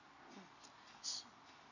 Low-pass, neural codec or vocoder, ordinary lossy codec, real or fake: 7.2 kHz; none; none; real